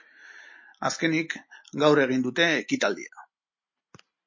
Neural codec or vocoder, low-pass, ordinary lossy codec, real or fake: none; 7.2 kHz; MP3, 32 kbps; real